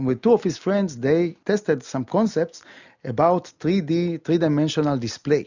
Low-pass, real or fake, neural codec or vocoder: 7.2 kHz; real; none